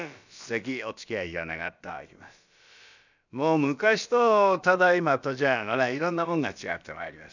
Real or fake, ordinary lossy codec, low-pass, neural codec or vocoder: fake; none; 7.2 kHz; codec, 16 kHz, about 1 kbps, DyCAST, with the encoder's durations